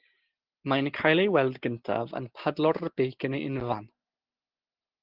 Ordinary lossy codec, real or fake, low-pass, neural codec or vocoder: Opus, 16 kbps; real; 5.4 kHz; none